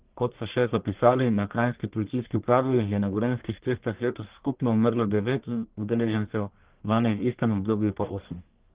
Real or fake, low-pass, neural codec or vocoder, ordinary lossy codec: fake; 3.6 kHz; codec, 44.1 kHz, 1.7 kbps, Pupu-Codec; Opus, 16 kbps